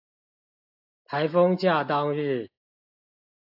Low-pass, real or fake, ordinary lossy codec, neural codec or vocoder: 5.4 kHz; real; AAC, 48 kbps; none